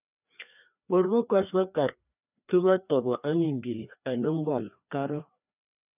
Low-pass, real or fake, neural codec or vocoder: 3.6 kHz; fake; codec, 16 kHz, 2 kbps, FreqCodec, larger model